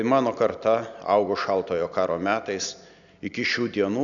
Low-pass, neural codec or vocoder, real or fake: 7.2 kHz; none; real